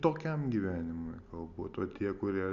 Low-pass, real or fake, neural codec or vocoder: 7.2 kHz; real; none